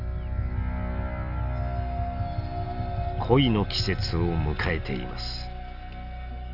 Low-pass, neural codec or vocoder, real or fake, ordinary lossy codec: 5.4 kHz; none; real; none